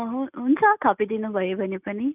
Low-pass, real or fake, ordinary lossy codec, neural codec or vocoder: 3.6 kHz; real; none; none